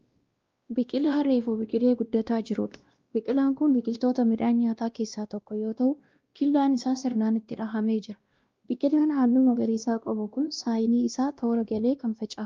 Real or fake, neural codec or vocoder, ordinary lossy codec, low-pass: fake; codec, 16 kHz, 1 kbps, X-Codec, WavLM features, trained on Multilingual LibriSpeech; Opus, 24 kbps; 7.2 kHz